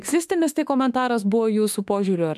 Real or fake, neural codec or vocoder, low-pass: fake; autoencoder, 48 kHz, 32 numbers a frame, DAC-VAE, trained on Japanese speech; 14.4 kHz